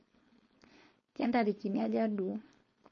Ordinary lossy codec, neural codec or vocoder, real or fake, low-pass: MP3, 32 kbps; codec, 16 kHz, 4.8 kbps, FACodec; fake; 7.2 kHz